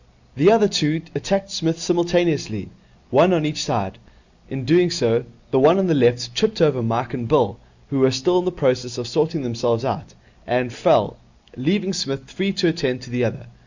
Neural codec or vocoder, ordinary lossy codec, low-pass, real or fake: none; Opus, 64 kbps; 7.2 kHz; real